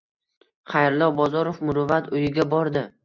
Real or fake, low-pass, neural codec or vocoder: real; 7.2 kHz; none